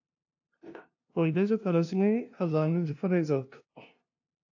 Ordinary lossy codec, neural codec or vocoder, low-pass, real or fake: AAC, 48 kbps; codec, 16 kHz, 0.5 kbps, FunCodec, trained on LibriTTS, 25 frames a second; 7.2 kHz; fake